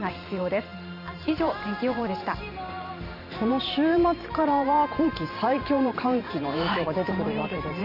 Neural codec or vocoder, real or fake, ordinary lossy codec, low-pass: vocoder, 44.1 kHz, 128 mel bands every 512 samples, BigVGAN v2; fake; AAC, 48 kbps; 5.4 kHz